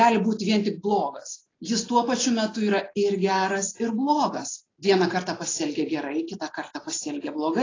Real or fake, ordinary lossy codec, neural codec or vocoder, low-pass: real; AAC, 32 kbps; none; 7.2 kHz